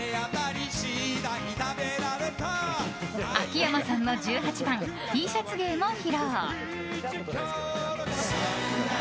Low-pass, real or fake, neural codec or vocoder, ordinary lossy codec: none; real; none; none